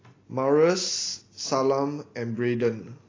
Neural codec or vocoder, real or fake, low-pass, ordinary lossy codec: none; real; 7.2 kHz; AAC, 32 kbps